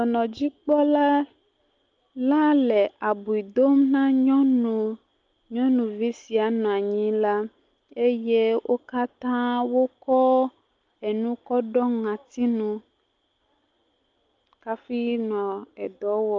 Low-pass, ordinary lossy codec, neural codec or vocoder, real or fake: 7.2 kHz; Opus, 24 kbps; none; real